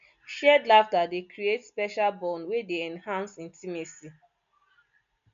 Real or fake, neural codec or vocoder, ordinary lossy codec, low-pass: real; none; MP3, 64 kbps; 7.2 kHz